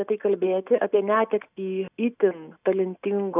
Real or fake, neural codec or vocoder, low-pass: real; none; 3.6 kHz